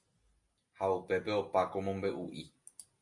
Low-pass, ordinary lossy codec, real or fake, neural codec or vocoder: 10.8 kHz; MP3, 64 kbps; real; none